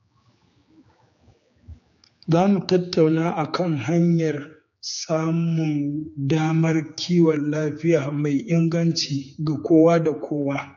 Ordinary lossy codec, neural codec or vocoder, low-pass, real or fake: AAC, 48 kbps; codec, 16 kHz, 4 kbps, X-Codec, HuBERT features, trained on general audio; 7.2 kHz; fake